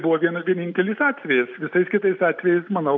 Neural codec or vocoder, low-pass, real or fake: none; 7.2 kHz; real